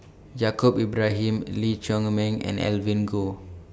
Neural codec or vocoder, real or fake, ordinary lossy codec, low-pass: none; real; none; none